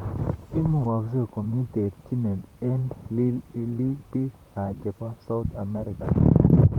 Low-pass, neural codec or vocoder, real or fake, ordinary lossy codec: 19.8 kHz; vocoder, 44.1 kHz, 128 mel bands, Pupu-Vocoder; fake; Opus, 24 kbps